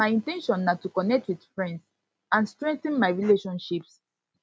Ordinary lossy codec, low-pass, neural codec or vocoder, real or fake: none; none; none; real